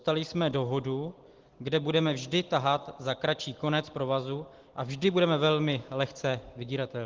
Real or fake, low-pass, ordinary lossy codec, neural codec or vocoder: real; 7.2 kHz; Opus, 16 kbps; none